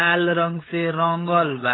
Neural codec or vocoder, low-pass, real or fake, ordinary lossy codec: codec, 16 kHz, 8 kbps, FunCodec, trained on LibriTTS, 25 frames a second; 7.2 kHz; fake; AAC, 16 kbps